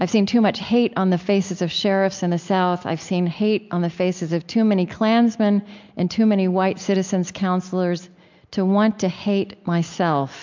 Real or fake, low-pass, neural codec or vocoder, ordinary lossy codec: real; 7.2 kHz; none; MP3, 64 kbps